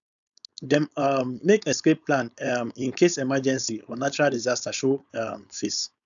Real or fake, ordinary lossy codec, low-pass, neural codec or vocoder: fake; none; 7.2 kHz; codec, 16 kHz, 4.8 kbps, FACodec